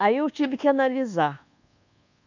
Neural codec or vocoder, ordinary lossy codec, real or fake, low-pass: codec, 24 kHz, 1.2 kbps, DualCodec; none; fake; 7.2 kHz